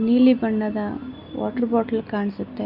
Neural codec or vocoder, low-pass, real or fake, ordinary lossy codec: none; 5.4 kHz; real; MP3, 48 kbps